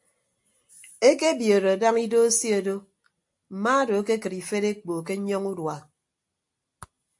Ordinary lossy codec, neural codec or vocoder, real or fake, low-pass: AAC, 64 kbps; none; real; 10.8 kHz